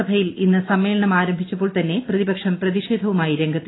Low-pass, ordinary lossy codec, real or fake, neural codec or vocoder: 7.2 kHz; AAC, 16 kbps; real; none